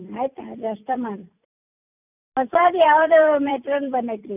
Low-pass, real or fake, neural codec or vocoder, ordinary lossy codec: 3.6 kHz; fake; vocoder, 44.1 kHz, 128 mel bands every 256 samples, BigVGAN v2; none